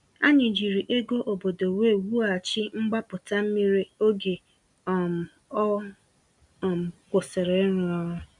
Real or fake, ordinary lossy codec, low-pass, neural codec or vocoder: real; none; 10.8 kHz; none